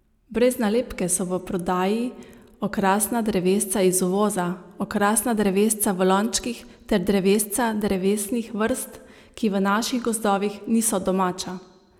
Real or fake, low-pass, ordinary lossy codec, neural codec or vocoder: real; 19.8 kHz; none; none